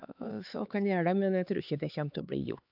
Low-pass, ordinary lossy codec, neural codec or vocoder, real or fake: 5.4 kHz; AAC, 48 kbps; codec, 16 kHz, 4 kbps, X-Codec, HuBERT features, trained on LibriSpeech; fake